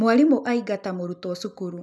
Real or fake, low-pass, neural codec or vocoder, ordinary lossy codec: real; none; none; none